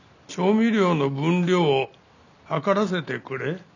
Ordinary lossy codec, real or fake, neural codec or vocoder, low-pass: none; real; none; 7.2 kHz